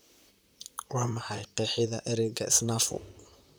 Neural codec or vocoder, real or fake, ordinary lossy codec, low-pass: vocoder, 44.1 kHz, 128 mel bands, Pupu-Vocoder; fake; none; none